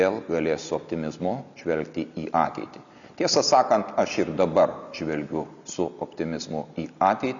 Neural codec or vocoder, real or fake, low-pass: none; real; 7.2 kHz